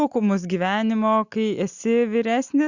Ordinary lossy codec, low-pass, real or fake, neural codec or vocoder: Opus, 64 kbps; 7.2 kHz; real; none